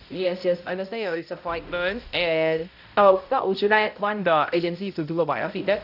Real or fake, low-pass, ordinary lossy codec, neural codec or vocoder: fake; 5.4 kHz; none; codec, 16 kHz, 0.5 kbps, X-Codec, HuBERT features, trained on balanced general audio